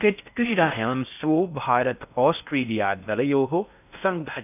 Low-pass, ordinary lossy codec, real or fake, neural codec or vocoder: 3.6 kHz; none; fake; codec, 16 kHz in and 24 kHz out, 0.6 kbps, FocalCodec, streaming, 4096 codes